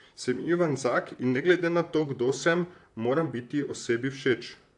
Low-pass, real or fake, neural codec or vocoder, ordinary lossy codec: 10.8 kHz; fake; vocoder, 44.1 kHz, 128 mel bands, Pupu-Vocoder; AAC, 64 kbps